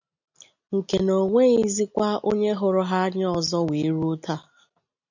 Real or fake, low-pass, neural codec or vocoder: real; 7.2 kHz; none